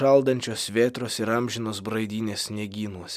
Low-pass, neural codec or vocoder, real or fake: 14.4 kHz; none; real